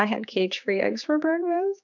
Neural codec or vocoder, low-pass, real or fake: codec, 16 kHz, 4 kbps, X-Codec, HuBERT features, trained on general audio; 7.2 kHz; fake